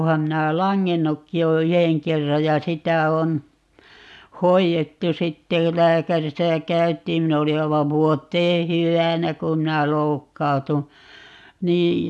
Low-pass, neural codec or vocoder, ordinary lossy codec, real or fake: none; none; none; real